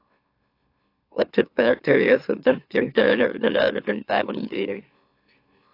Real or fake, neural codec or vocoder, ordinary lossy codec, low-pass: fake; autoencoder, 44.1 kHz, a latent of 192 numbers a frame, MeloTTS; AAC, 32 kbps; 5.4 kHz